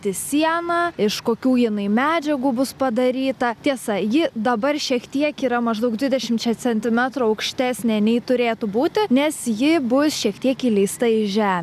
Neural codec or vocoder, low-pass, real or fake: none; 14.4 kHz; real